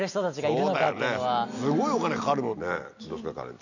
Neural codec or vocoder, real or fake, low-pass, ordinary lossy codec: none; real; 7.2 kHz; none